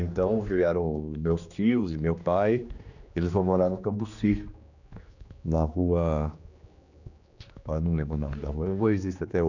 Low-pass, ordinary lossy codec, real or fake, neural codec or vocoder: 7.2 kHz; none; fake; codec, 16 kHz, 2 kbps, X-Codec, HuBERT features, trained on general audio